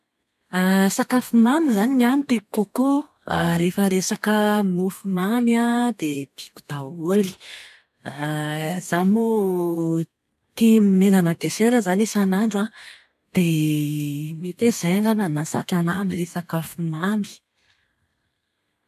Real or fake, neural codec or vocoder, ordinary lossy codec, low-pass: fake; codec, 32 kHz, 1.9 kbps, SNAC; none; 14.4 kHz